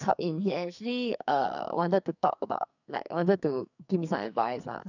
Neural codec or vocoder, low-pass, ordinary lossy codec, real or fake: codec, 44.1 kHz, 2.6 kbps, SNAC; 7.2 kHz; none; fake